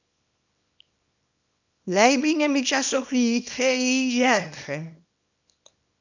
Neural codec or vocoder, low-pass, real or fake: codec, 24 kHz, 0.9 kbps, WavTokenizer, small release; 7.2 kHz; fake